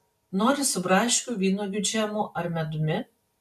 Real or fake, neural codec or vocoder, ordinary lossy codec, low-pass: real; none; MP3, 96 kbps; 14.4 kHz